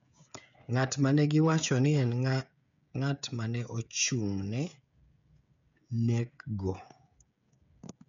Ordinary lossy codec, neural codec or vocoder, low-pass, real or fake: MP3, 64 kbps; codec, 16 kHz, 16 kbps, FreqCodec, smaller model; 7.2 kHz; fake